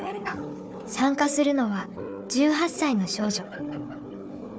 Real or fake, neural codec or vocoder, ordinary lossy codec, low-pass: fake; codec, 16 kHz, 4 kbps, FunCodec, trained on Chinese and English, 50 frames a second; none; none